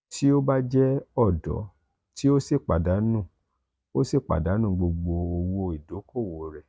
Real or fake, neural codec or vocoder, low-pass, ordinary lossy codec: real; none; none; none